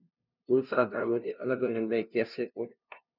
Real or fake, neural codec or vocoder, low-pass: fake; codec, 16 kHz, 0.5 kbps, FunCodec, trained on LibriTTS, 25 frames a second; 5.4 kHz